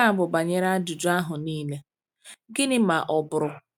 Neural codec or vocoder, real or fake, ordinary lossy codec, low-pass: none; real; none; 19.8 kHz